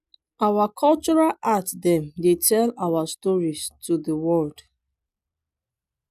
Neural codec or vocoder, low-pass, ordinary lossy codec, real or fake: none; 14.4 kHz; none; real